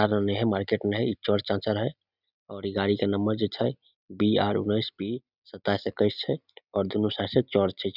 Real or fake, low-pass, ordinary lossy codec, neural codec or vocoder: real; 5.4 kHz; none; none